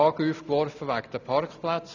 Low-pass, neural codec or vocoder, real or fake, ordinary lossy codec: 7.2 kHz; none; real; none